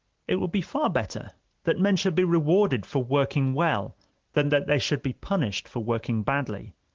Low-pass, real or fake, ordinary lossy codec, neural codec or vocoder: 7.2 kHz; real; Opus, 16 kbps; none